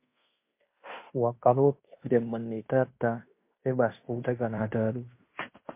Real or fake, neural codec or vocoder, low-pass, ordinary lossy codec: fake; codec, 16 kHz in and 24 kHz out, 0.9 kbps, LongCat-Audio-Codec, fine tuned four codebook decoder; 3.6 kHz; MP3, 24 kbps